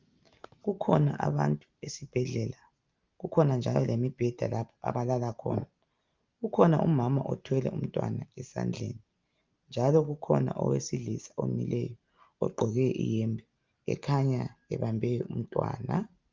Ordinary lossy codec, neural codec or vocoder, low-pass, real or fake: Opus, 32 kbps; none; 7.2 kHz; real